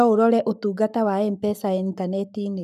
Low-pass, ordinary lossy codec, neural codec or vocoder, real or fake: 14.4 kHz; none; codec, 44.1 kHz, 7.8 kbps, Pupu-Codec; fake